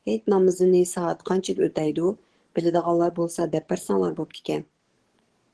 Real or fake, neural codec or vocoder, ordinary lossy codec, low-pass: fake; codec, 44.1 kHz, 7.8 kbps, DAC; Opus, 16 kbps; 10.8 kHz